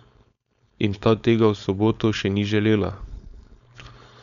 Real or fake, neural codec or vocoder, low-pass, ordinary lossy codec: fake; codec, 16 kHz, 4.8 kbps, FACodec; 7.2 kHz; none